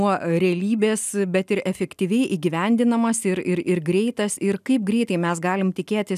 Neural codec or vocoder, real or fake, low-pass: none; real; 14.4 kHz